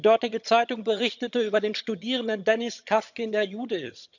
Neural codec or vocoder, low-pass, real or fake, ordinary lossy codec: vocoder, 22.05 kHz, 80 mel bands, HiFi-GAN; 7.2 kHz; fake; none